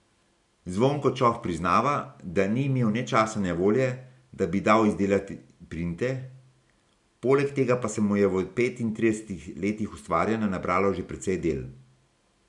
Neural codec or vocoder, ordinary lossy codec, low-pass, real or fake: none; none; 10.8 kHz; real